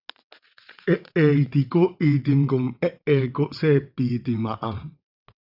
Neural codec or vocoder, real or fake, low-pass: vocoder, 22.05 kHz, 80 mel bands, WaveNeXt; fake; 5.4 kHz